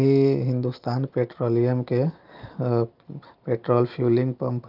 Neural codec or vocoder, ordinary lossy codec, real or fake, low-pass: none; Opus, 32 kbps; real; 5.4 kHz